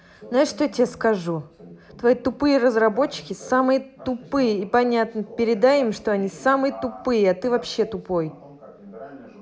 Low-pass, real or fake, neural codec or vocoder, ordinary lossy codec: none; real; none; none